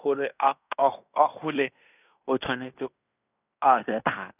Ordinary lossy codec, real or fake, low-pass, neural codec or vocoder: none; fake; 3.6 kHz; codec, 16 kHz in and 24 kHz out, 0.9 kbps, LongCat-Audio-Codec, fine tuned four codebook decoder